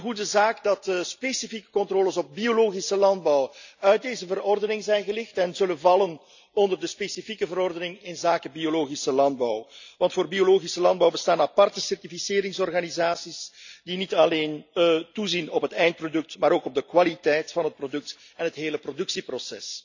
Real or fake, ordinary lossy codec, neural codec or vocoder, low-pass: real; none; none; 7.2 kHz